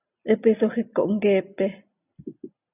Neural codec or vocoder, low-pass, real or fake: none; 3.6 kHz; real